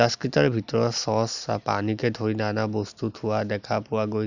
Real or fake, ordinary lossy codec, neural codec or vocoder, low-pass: real; none; none; 7.2 kHz